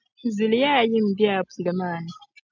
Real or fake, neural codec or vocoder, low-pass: real; none; 7.2 kHz